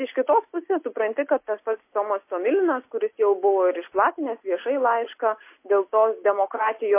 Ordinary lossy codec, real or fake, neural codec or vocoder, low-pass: MP3, 24 kbps; real; none; 3.6 kHz